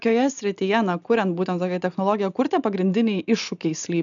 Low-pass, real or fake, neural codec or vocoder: 7.2 kHz; real; none